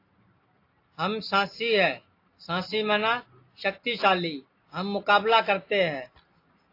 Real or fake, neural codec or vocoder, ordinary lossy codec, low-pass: real; none; AAC, 32 kbps; 5.4 kHz